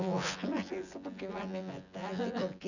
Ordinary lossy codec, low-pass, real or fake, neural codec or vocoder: none; 7.2 kHz; fake; vocoder, 24 kHz, 100 mel bands, Vocos